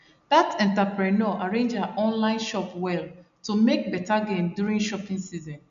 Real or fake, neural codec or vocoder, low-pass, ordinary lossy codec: real; none; 7.2 kHz; none